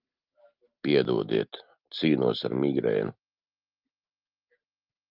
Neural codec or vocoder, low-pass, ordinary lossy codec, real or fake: none; 5.4 kHz; Opus, 24 kbps; real